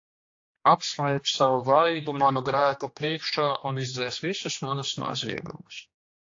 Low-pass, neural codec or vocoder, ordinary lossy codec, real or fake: 7.2 kHz; codec, 16 kHz, 2 kbps, X-Codec, HuBERT features, trained on general audio; AAC, 48 kbps; fake